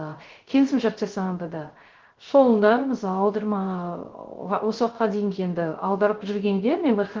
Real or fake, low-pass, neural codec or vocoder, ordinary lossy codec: fake; 7.2 kHz; codec, 16 kHz, 0.3 kbps, FocalCodec; Opus, 16 kbps